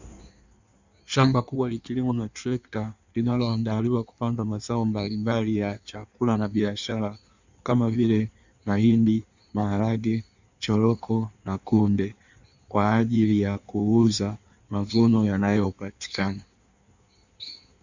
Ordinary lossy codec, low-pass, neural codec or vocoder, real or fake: Opus, 64 kbps; 7.2 kHz; codec, 16 kHz in and 24 kHz out, 1.1 kbps, FireRedTTS-2 codec; fake